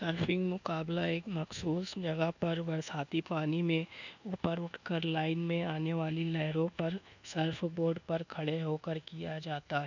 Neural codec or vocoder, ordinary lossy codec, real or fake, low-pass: codec, 24 kHz, 1.2 kbps, DualCodec; none; fake; 7.2 kHz